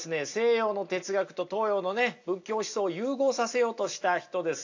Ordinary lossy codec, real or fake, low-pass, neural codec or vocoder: AAC, 48 kbps; real; 7.2 kHz; none